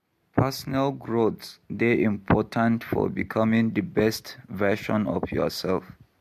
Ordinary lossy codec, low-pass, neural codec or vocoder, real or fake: MP3, 64 kbps; 14.4 kHz; none; real